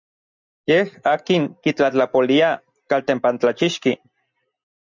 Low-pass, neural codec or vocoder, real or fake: 7.2 kHz; none; real